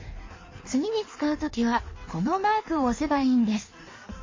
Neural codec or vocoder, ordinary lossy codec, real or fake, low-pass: codec, 16 kHz in and 24 kHz out, 1.1 kbps, FireRedTTS-2 codec; MP3, 32 kbps; fake; 7.2 kHz